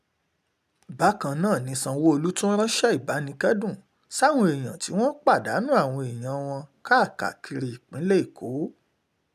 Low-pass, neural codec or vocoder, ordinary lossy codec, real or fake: 14.4 kHz; none; none; real